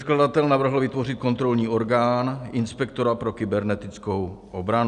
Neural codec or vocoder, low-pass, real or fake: none; 10.8 kHz; real